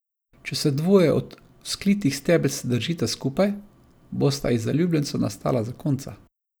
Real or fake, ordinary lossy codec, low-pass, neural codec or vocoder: real; none; none; none